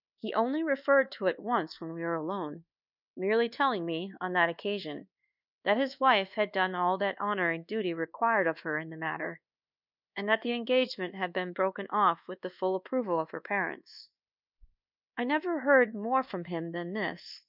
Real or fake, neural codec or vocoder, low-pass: fake; codec, 24 kHz, 1.2 kbps, DualCodec; 5.4 kHz